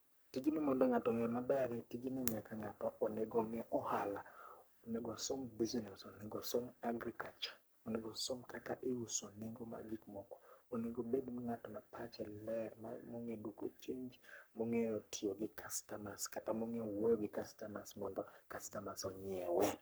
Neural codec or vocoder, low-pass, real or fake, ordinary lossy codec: codec, 44.1 kHz, 3.4 kbps, Pupu-Codec; none; fake; none